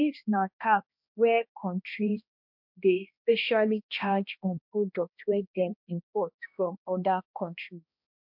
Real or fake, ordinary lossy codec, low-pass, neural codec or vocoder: fake; MP3, 48 kbps; 5.4 kHz; codec, 16 kHz, 1 kbps, X-Codec, HuBERT features, trained on balanced general audio